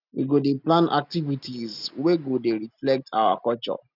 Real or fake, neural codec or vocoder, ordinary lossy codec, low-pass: real; none; none; 5.4 kHz